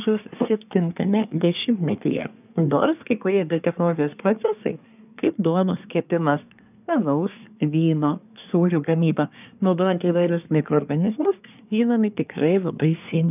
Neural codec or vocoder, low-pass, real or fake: codec, 24 kHz, 1 kbps, SNAC; 3.6 kHz; fake